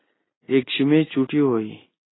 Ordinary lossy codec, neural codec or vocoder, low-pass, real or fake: AAC, 16 kbps; none; 7.2 kHz; real